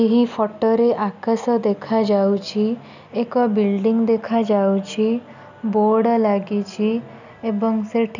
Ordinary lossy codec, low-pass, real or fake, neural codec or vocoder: none; 7.2 kHz; real; none